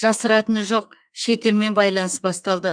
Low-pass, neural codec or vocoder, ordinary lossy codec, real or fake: 9.9 kHz; codec, 32 kHz, 1.9 kbps, SNAC; none; fake